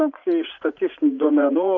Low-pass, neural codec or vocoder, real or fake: 7.2 kHz; vocoder, 44.1 kHz, 80 mel bands, Vocos; fake